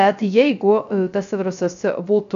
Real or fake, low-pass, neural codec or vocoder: fake; 7.2 kHz; codec, 16 kHz, 0.3 kbps, FocalCodec